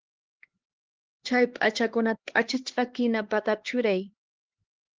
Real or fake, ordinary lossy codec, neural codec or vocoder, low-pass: fake; Opus, 16 kbps; codec, 16 kHz, 2 kbps, X-Codec, HuBERT features, trained on LibriSpeech; 7.2 kHz